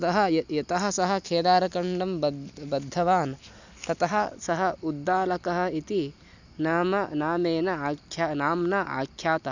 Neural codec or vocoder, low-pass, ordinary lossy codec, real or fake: none; 7.2 kHz; none; real